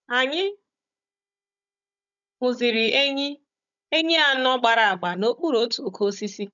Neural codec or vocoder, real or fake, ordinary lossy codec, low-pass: codec, 16 kHz, 16 kbps, FunCodec, trained on Chinese and English, 50 frames a second; fake; none; 7.2 kHz